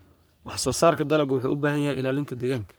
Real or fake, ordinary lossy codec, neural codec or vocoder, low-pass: fake; none; codec, 44.1 kHz, 3.4 kbps, Pupu-Codec; none